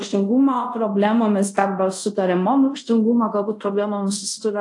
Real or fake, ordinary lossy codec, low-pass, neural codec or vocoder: fake; AAC, 64 kbps; 10.8 kHz; codec, 24 kHz, 0.5 kbps, DualCodec